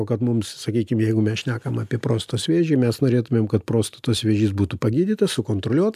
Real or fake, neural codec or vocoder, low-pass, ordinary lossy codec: real; none; 14.4 kHz; AAC, 96 kbps